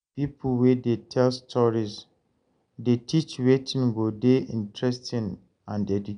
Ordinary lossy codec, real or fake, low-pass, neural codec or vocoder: none; real; 9.9 kHz; none